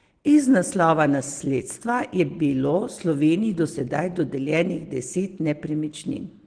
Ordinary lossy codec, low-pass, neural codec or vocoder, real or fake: Opus, 16 kbps; 9.9 kHz; none; real